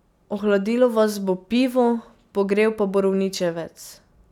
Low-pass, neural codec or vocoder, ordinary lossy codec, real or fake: 19.8 kHz; none; none; real